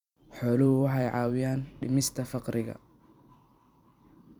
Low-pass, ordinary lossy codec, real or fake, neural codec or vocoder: 19.8 kHz; none; fake; vocoder, 44.1 kHz, 128 mel bands every 256 samples, BigVGAN v2